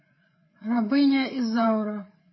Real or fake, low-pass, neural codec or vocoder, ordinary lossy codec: fake; 7.2 kHz; codec, 16 kHz, 8 kbps, FreqCodec, larger model; MP3, 24 kbps